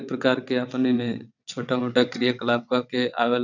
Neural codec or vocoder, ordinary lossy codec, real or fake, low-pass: vocoder, 22.05 kHz, 80 mel bands, WaveNeXt; none; fake; 7.2 kHz